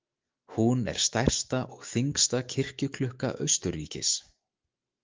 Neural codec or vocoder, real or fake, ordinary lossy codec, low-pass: autoencoder, 48 kHz, 128 numbers a frame, DAC-VAE, trained on Japanese speech; fake; Opus, 24 kbps; 7.2 kHz